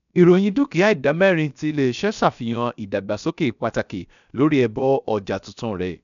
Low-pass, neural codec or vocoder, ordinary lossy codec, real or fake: 7.2 kHz; codec, 16 kHz, about 1 kbps, DyCAST, with the encoder's durations; none; fake